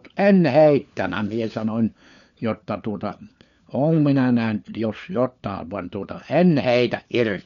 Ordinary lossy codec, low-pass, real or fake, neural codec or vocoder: none; 7.2 kHz; fake; codec, 16 kHz, 4 kbps, FunCodec, trained on LibriTTS, 50 frames a second